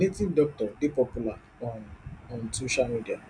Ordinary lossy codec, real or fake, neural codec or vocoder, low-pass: none; real; none; 9.9 kHz